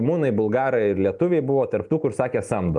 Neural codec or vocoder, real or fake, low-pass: none; real; 10.8 kHz